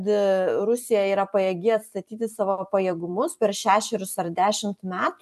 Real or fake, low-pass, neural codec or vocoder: fake; 14.4 kHz; vocoder, 44.1 kHz, 128 mel bands every 256 samples, BigVGAN v2